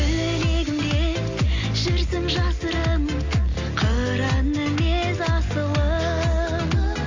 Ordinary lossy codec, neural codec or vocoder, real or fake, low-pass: AAC, 48 kbps; none; real; 7.2 kHz